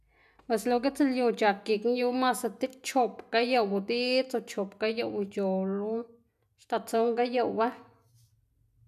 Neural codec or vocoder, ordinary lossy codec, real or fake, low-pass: none; none; real; 14.4 kHz